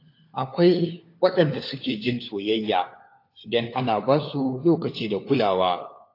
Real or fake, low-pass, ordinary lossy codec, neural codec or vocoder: fake; 5.4 kHz; AAC, 32 kbps; codec, 16 kHz, 4 kbps, FunCodec, trained on LibriTTS, 50 frames a second